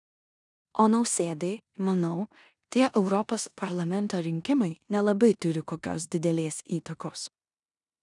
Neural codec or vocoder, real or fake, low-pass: codec, 16 kHz in and 24 kHz out, 0.9 kbps, LongCat-Audio-Codec, four codebook decoder; fake; 10.8 kHz